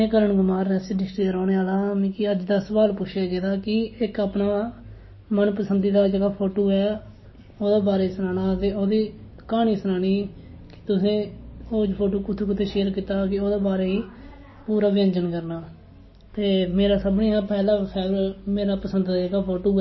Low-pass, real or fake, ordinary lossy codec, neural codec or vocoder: 7.2 kHz; real; MP3, 24 kbps; none